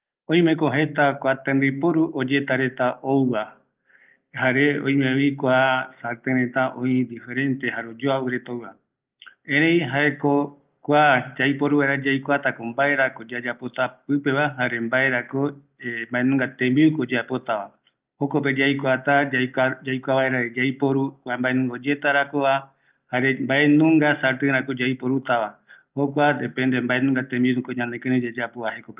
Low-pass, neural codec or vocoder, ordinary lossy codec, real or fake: 3.6 kHz; none; Opus, 24 kbps; real